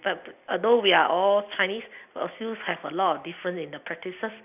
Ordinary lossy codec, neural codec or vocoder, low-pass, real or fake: none; none; 3.6 kHz; real